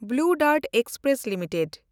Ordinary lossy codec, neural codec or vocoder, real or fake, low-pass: none; none; real; none